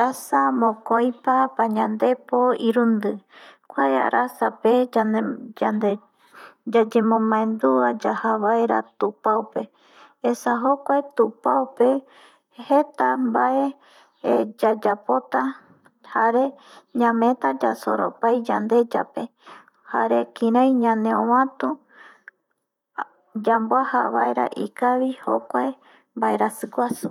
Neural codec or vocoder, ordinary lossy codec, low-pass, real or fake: vocoder, 44.1 kHz, 128 mel bands, Pupu-Vocoder; none; 19.8 kHz; fake